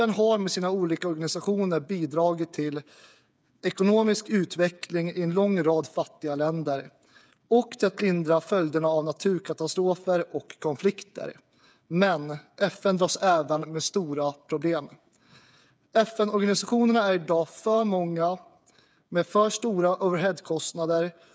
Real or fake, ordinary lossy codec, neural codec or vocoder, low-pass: fake; none; codec, 16 kHz, 8 kbps, FreqCodec, smaller model; none